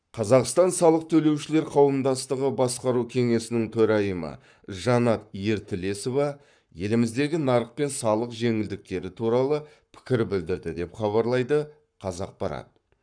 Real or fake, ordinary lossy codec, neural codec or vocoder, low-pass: fake; none; codec, 44.1 kHz, 7.8 kbps, Pupu-Codec; 9.9 kHz